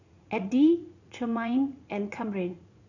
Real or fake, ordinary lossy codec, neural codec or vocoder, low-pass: fake; none; vocoder, 44.1 kHz, 80 mel bands, Vocos; 7.2 kHz